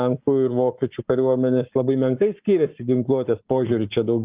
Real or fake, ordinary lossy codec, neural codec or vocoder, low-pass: fake; Opus, 24 kbps; codec, 44.1 kHz, 7.8 kbps, Pupu-Codec; 3.6 kHz